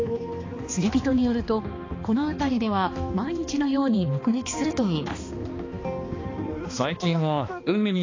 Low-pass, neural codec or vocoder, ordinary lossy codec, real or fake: 7.2 kHz; codec, 16 kHz, 2 kbps, X-Codec, HuBERT features, trained on balanced general audio; AAC, 32 kbps; fake